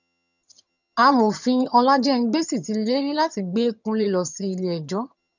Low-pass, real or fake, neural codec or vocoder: 7.2 kHz; fake; vocoder, 22.05 kHz, 80 mel bands, HiFi-GAN